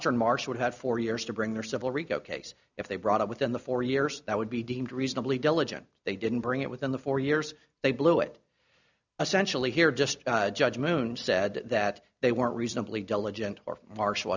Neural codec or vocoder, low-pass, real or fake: none; 7.2 kHz; real